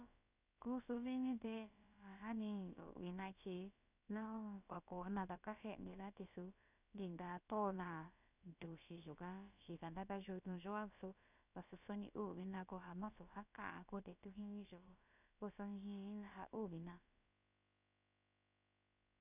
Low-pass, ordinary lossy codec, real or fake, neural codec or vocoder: 3.6 kHz; none; fake; codec, 16 kHz, about 1 kbps, DyCAST, with the encoder's durations